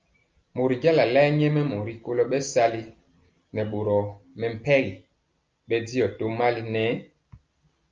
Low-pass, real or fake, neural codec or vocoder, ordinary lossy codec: 7.2 kHz; real; none; Opus, 24 kbps